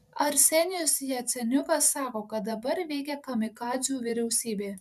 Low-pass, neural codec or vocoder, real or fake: 14.4 kHz; vocoder, 44.1 kHz, 128 mel bands every 512 samples, BigVGAN v2; fake